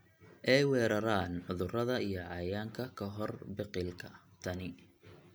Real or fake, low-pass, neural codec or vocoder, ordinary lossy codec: real; none; none; none